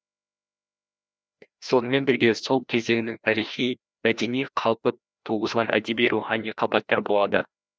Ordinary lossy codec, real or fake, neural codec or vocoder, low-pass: none; fake; codec, 16 kHz, 1 kbps, FreqCodec, larger model; none